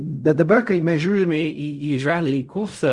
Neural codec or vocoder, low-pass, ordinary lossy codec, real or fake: codec, 16 kHz in and 24 kHz out, 0.4 kbps, LongCat-Audio-Codec, fine tuned four codebook decoder; 10.8 kHz; Opus, 64 kbps; fake